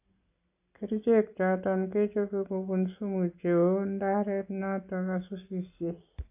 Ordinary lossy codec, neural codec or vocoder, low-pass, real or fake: AAC, 32 kbps; none; 3.6 kHz; real